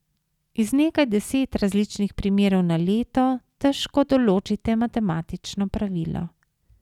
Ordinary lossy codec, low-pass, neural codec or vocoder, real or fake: none; 19.8 kHz; none; real